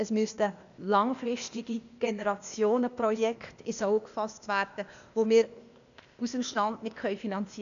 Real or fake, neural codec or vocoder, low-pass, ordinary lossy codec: fake; codec, 16 kHz, 0.8 kbps, ZipCodec; 7.2 kHz; none